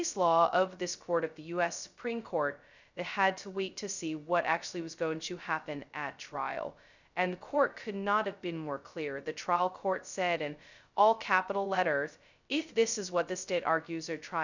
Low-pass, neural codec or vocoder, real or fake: 7.2 kHz; codec, 16 kHz, 0.2 kbps, FocalCodec; fake